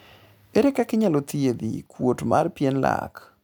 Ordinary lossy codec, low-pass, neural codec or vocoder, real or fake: none; none; none; real